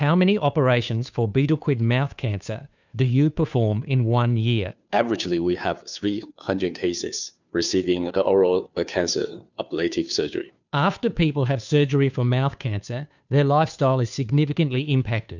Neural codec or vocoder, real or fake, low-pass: codec, 16 kHz, 2 kbps, FunCodec, trained on Chinese and English, 25 frames a second; fake; 7.2 kHz